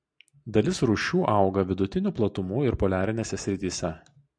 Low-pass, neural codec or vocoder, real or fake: 9.9 kHz; none; real